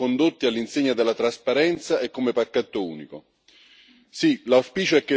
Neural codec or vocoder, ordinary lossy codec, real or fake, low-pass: none; none; real; none